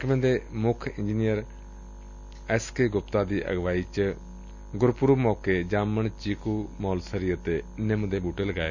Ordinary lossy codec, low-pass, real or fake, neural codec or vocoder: none; 7.2 kHz; real; none